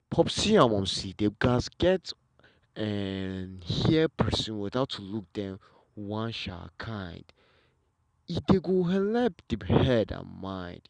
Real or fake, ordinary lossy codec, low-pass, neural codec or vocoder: real; Opus, 64 kbps; 9.9 kHz; none